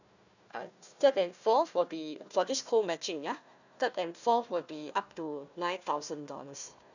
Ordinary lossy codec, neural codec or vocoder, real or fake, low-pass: none; codec, 16 kHz, 1 kbps, FunCodec, trained on Chinese and English, 50 frames a second; fake; 7.2 kHz